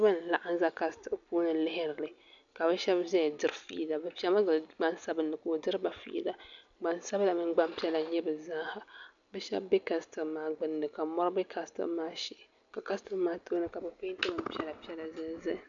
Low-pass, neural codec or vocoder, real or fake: 7.2 kHz; none; real